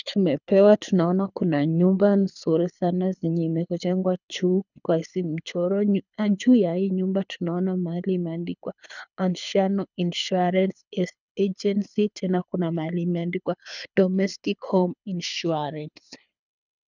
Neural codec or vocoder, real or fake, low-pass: codec, 24 kHz, 6 kbps, HILCodec; fake; 7.2 kHz